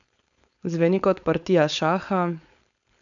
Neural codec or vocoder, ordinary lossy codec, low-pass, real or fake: codec, 16 kHz, 4.8 kbps, FACodec; none; 7.2 kHz; fake